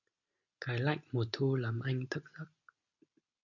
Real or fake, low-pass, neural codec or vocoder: real; 7.2 kHz; none